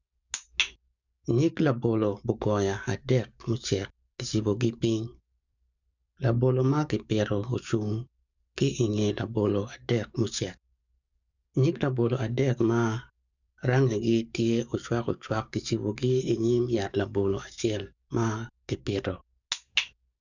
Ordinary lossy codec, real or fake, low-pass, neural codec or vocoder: none; fake; 7.2 kHz; codec, 44.1 kHz, 7.8 kbps, DAC